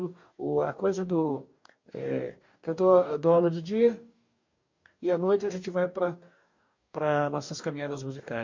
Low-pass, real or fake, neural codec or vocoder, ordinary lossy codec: 7.2 kHz; fake; codec, 44.1 kHz, 2.6 kbps, DAC; MP3, 48 kbps